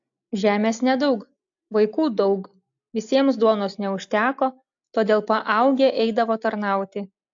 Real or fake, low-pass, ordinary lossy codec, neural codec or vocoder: real; 7.2 kHz; AAC, 64 kbps; none